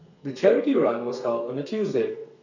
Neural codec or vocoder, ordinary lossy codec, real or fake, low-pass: codec, 32 kHz, 1.9 kbps, SNAC; none; fake; 7.2 kHz